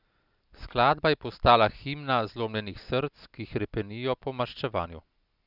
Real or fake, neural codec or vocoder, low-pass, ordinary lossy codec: real; none; 5.4 kHz; none